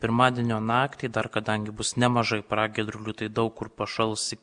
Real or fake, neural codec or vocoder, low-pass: real; none; 9.9 kHz